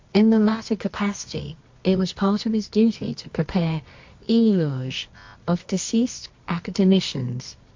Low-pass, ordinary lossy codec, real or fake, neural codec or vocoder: 7.2 kHz; MP3, 48 kbps; fake; codec, 24 kHz, 0.9 kbps, WavTokenizer, medium music audio release